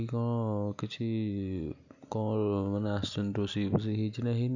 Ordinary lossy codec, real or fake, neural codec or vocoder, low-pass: none; real; none; 7.2 kHz